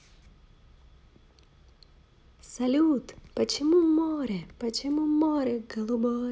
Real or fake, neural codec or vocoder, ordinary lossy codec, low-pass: real; none; none; none